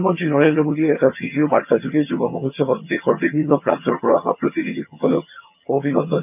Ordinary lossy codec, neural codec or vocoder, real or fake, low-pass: none; vocoder, 22.05 kHz, 80 mel bands, HiFi-GAN; fake; 3.6 kHz